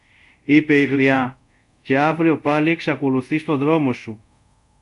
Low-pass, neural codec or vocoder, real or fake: 10.8 kHz; codec, 24 kHz, 0.5 kbps, DualCodec; fake